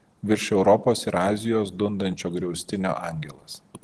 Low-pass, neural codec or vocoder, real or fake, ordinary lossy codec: 10.8 kHz; none; real; Opus, 16 kbps